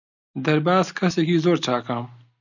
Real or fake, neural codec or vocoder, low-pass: real; none; 7.2 kHz